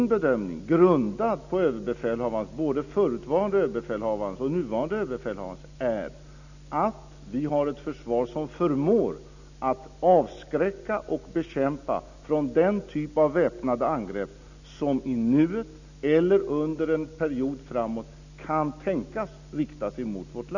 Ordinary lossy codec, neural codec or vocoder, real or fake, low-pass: none; none; real; 7.2 kHz